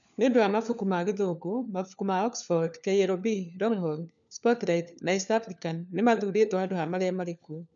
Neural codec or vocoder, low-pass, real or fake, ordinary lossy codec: codec, 16 kHz, 4 kbps, FunCodec, trained on LibriTTS, 50 frames a second; 7.2 kHz; fake; none